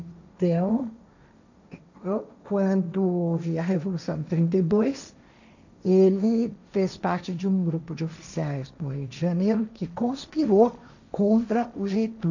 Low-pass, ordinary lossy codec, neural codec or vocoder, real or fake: 7.2 kHz; none; codec, 16 kHz, 1.1 kbps, Voila-Tokenizer; fake